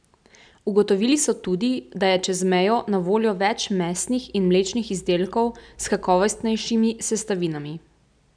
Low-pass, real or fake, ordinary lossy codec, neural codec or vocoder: 9.9 kHz; real; none; none